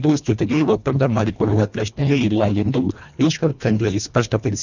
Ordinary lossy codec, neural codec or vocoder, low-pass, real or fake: none; codec, 24 kHz, 1.5 kbps, HILCodec; 7.2 kHz; fake